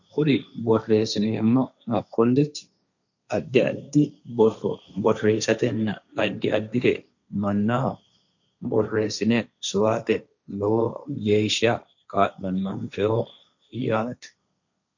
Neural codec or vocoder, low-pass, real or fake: codec, 16 kHz, 1.1 kbps, Voila-Tokenizer; 7.2 kHz; fake